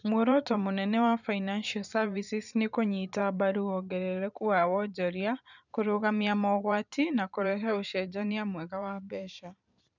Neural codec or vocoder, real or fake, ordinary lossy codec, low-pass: vocoder, 44.1 kHz, 128 mel bands every 512 samples, BigVGAN v2; fake; none; 7.2 kHz